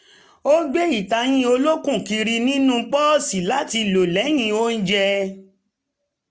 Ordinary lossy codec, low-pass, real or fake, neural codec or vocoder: none; none; real; none